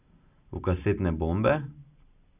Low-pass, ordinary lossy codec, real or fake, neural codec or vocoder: 3.6 kHz; none; real; none